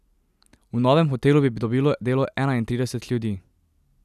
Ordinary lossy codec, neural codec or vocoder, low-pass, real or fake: none; none; 14.4 kHz; real